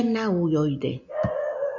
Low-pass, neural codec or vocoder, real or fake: 7.2 kHz; none; real